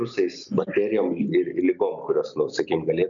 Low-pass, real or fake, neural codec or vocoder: 7.2 kHz; real; none